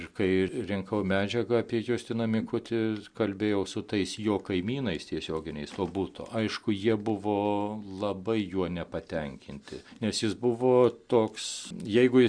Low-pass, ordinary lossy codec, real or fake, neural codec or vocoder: 9.9 kHz; Opus, 64 kbps; real; none